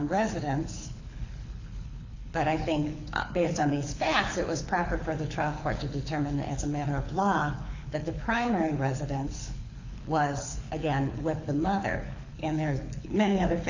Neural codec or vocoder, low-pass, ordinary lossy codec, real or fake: codec, 24 kHz, 6 kbps, HILCodec; 7.2 kHz; AAC, 48 kbps; fake